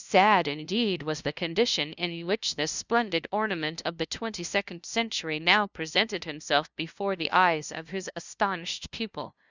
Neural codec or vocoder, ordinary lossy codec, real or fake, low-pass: codec, 16 kHz, 0.5 kbps, FunCodec, trained on LibriTTS, 25 frames a second; Opus, 64 kbps; fake; 7.2 kHz